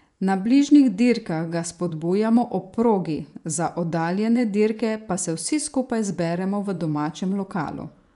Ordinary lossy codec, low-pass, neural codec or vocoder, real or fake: none; 10.8 kHz; vocoder, 24 kHz, 100 mel bands, Vocos; fake